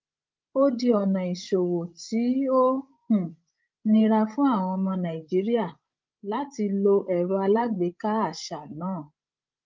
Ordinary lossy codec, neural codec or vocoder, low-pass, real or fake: Opus, 32 kbps; codec, 16 kHz, 16 kbps, FreqCodec, larger model; 7.2 kHz; fake